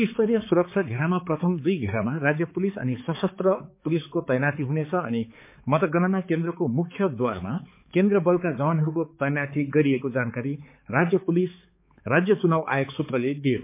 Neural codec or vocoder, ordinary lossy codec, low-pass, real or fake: codec, 16 kHz, 4 kbps, X-Codec, HuBERT features, trained on balanced general audio; MP3, 24 kbps; 3.6 kHz; fake